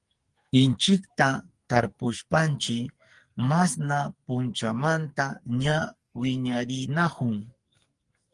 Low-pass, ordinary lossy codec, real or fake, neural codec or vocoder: 10.8 kHz; Opus, 24 kbps; fake; codec, 44.1 kHz, 2.6 kbps, SNAC